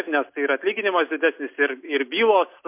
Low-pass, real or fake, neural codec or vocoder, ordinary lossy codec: 3.6 kHz; real; none; MP3, 32 kbps